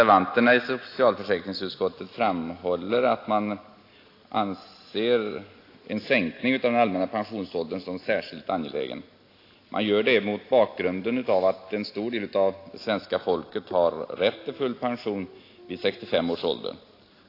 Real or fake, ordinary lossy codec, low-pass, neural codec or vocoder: real; AAC, 32 kbps; 5.4 kHz; none